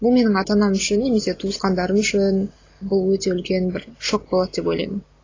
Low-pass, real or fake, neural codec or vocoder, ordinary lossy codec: 7.2 kHz; real; none; AAC, 32 kbps